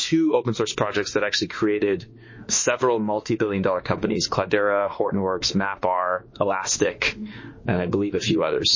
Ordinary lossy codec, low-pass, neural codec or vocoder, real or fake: MP3, 32 kbps; 7.2 kHz; autoencoder, 48 kHz, 32 numbers a frame, DAC-VAE, trained on Japanese speech; fake